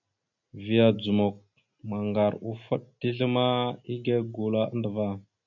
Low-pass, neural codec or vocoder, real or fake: 7.2 kHz; none; real